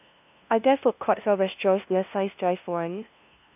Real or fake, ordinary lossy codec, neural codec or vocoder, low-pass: fake; none; codec, 16 kHz, 0.5 kbps, FunCodec, trained on LibriTTS, 25 frames a second; 3.6 kHz